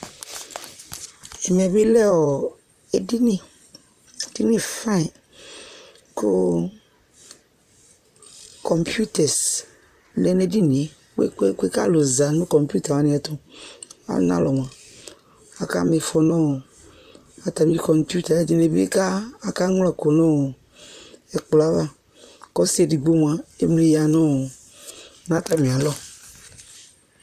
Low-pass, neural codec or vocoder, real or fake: 14.4 kHz; vocoder, 44.1 kHz, 128 mel bands, Pupu-Vocoder; fake